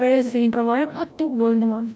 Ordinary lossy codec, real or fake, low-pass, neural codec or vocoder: none; fake; none; codec, 16 kHz, 0.5 kbps, FreqCodec, larger model